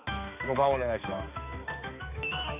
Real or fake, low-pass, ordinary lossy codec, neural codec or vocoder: fake; 3.6 kHz; none; codec, 16 kHz, 4 kbps, X-Codec, HuBERT features, trained on balanced general audio